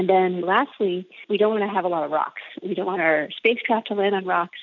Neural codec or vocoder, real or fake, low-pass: none; real; 7.2 kHz